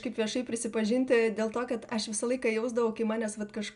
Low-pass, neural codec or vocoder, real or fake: 10.8 kHz; none; real